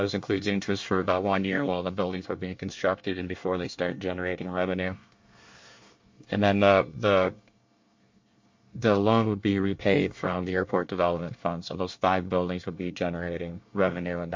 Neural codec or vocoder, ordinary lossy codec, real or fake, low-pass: codec, 24 kHz, 1 kbps, SNAC; MP3, 48 kbps; fake; 7.2 kHz